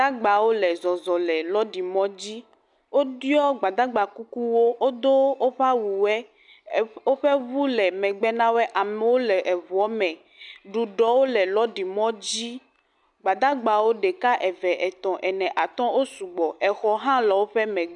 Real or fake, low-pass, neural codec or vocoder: real; 10.8 kHz; none